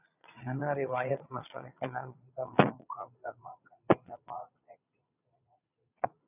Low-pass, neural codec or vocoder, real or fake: 3.6 kHz; vocoder, 22.05 kHz, 80 mel bands, Vocos; fake